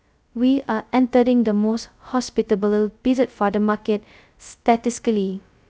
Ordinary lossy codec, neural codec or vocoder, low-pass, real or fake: none; codec, 16 kHz, 0.2 kbps, FocalCodec; none; fake